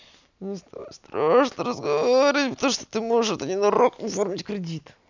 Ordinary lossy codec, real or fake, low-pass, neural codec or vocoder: none; real; 7.2 kHz; none